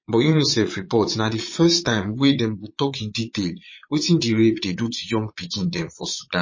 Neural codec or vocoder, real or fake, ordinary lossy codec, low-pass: vocoder, 22.05 kHz, 80 mel bands, Vocos; fake; MP3, 32 kbps; 7.2 kHz